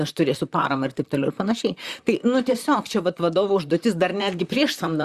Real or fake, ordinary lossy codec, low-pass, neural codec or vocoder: fake; Opus, 64 kbps; 14.4 kHz; vocoder, 44.1 kHz, 128 mel bands, Pupu-Vocoder